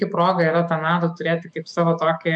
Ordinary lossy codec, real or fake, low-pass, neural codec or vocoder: MP3, 64 kbps; fake; 10.8 kHz; autoencoder, 48 kHz, 128 numbers a frame, DAC-VAE, trained on Japanese speech